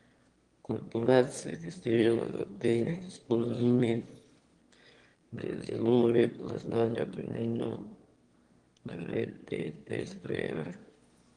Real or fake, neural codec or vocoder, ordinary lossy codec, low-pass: fake; autoencoder, 22.05 kHz, a latent of 192 numbers a frame, VITS, trained on one speaker; Opus, 24 kbps; 9.9 kHz